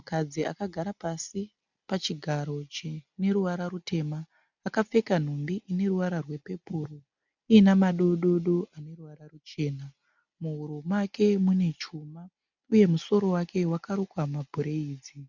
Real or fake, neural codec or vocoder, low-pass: real; none; 7.2 kHz